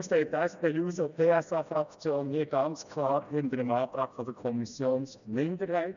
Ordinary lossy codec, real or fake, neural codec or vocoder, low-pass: MP3, 96 kbps; fake; codec, 16 kHz, 1 kbps, FreqCodec, smaller model; 7.2 kHz